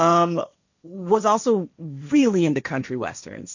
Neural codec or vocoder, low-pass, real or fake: codec, 16 kHz, 1.1 kbps, Voila-Tokenizer; 7.2 kHz; fake